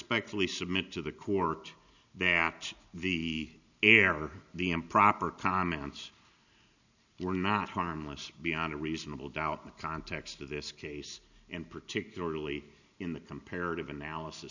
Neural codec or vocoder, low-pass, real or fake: none; 7.2 kHz; real